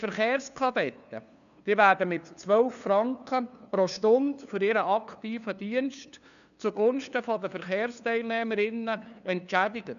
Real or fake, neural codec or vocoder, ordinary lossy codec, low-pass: fake; codec, 16 kHz, 2 kbps, FunCodec, trained on LibriTTS, 25 frames a second; none; 7.2 kHz